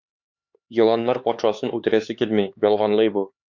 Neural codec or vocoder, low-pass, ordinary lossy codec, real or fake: codec, 16 kHz, 4 kbps, X-Codec, HuBERT features, trained on LibriSpeech; 7.2 kHz; Opus, 64 kbps; fake